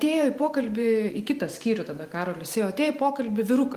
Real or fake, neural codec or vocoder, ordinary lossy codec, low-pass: fake; vocoder, 44.1 kHz, 128 mel bands every 512 samples, BigVGAN v2; Opus, 24 kbps; 14.4 kHz